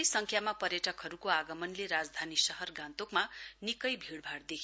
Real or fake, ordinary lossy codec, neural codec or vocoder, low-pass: real; none; none; none